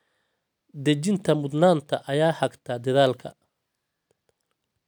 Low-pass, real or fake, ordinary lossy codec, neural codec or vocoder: none; real; none; none